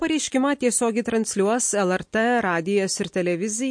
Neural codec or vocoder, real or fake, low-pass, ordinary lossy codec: none; real; 9.9 kHz; MP3, 48 kbps